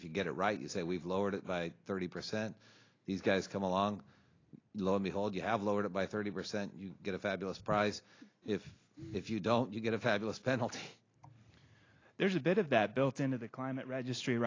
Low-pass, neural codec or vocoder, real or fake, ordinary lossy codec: 7.2 kHz; none; real; AAC, 32 kbps